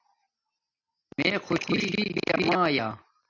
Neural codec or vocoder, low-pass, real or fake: none; 7.2 kHz; real